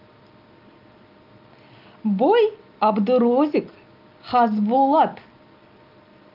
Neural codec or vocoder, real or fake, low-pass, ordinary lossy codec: none; real; 5.4 kHz; Opus, 32 kbps